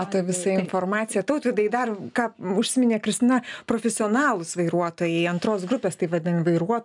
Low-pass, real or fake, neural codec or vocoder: 10.8 kHz; real; none